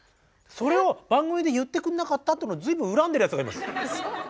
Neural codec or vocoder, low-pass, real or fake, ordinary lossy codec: none; none; real; none